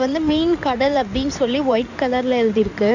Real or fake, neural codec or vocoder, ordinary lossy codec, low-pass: fake; codec, 16 kHz in and 24 kHz out, 2.2 kbps, FireRedTTS-2 codec; none; 7.2 kHz